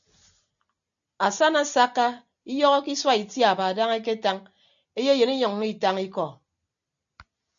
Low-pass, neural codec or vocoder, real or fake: 7.2 kHz; none; real